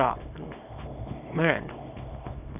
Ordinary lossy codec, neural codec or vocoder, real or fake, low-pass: none; codec, 24 kHz, 0.9 kbps, WavTokenizer, small release; fake; 3.6 kHz